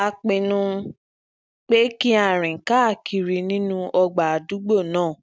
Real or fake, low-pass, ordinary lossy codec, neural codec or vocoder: real; none; none; none